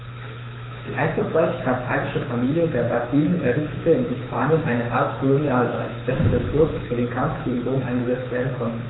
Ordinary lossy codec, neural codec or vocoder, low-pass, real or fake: AAC, 16 kbps; codec, 24 kHz, 6 kbps, HILCodec; 7.2 kHz; fake